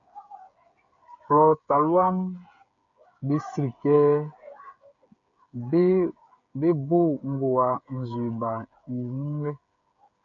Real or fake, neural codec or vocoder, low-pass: fake; codec, 16 kHz, 8 kbps, FreqCodec, smaller model; 7.2 kHz